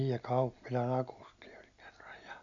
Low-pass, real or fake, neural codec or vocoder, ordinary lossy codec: 7.2 kHz; real; none; none